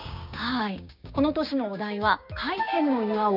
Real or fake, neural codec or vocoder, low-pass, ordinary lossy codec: fake; codec, 16 kHz, 6 kbps, DAC; 5.4 kHz; none